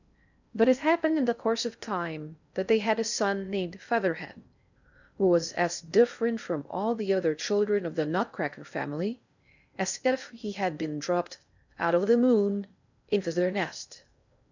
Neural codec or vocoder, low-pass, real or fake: codec, 16 kHz in and 24 kHz out, 0.6 kbps, FocalCodec, streaming, 2048 codes; 7.2 kHz; fake